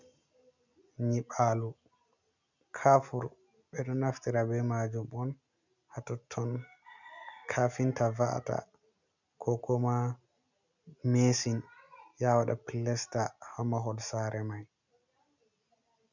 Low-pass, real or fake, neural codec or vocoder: 7.2 kHz; real; none